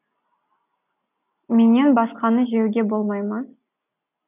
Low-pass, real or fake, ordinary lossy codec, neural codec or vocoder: 3.6 kHz; real; none; none